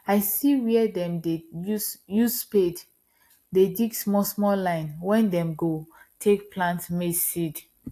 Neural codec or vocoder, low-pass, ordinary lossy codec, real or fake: none; 14.4 kHz; AAC, 64 kbps; real